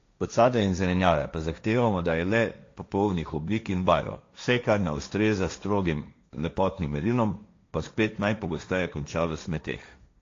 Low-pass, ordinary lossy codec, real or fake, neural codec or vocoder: 7.2 kHz; AAC, 48 kbps; fake; codec, 16 kHz, 1.1 kbps, Voila-Tokenizer